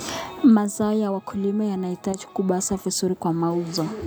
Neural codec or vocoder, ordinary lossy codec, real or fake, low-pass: none; none; real; none